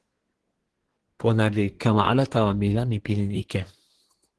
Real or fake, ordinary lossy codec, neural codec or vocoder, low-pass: fake; Opus, 16 kbps; codec, 24 kHz, 1 kbps, SNAC; 10.8 kHz